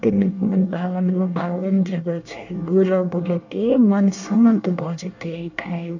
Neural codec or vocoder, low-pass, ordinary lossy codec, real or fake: codec, 24 kHz, 1 kbps, SNAC; 7.2 kHz; none; fake